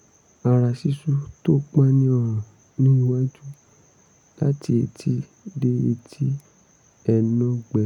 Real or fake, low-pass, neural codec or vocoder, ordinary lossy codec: real; 19.8 kHz; none; none